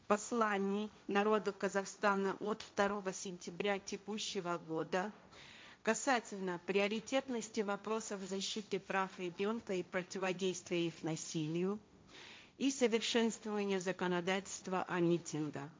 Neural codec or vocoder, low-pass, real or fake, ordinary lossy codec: codec, 16 kHz, 1.1 kbps, Voila-Tokenizer; none; fake; none